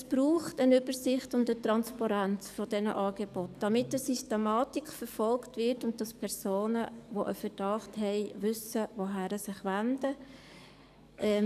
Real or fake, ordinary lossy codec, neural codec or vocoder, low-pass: fake; none; codec, 44.1 kHz, 7.8 kbps, DAC; 14.4 kHz